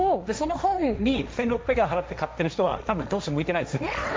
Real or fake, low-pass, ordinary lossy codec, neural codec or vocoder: fake; none; none; codec, 16 kHz, 1.1 kbps, Voila-Tokenizer